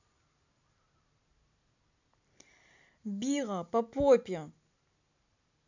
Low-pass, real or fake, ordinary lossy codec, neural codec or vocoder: 7.2 kHz; real; none; none